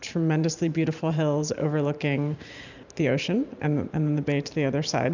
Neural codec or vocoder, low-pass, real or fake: vocoder, 44.1 kHz, 128 mel bands every 512 samples, BigVGAN v2; 7.2 kHz; fake